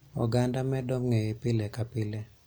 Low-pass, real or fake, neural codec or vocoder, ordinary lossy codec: none; real; none; none